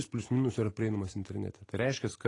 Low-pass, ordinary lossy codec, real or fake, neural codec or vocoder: 10.8 kHz; AAC, 32 kbps; real; none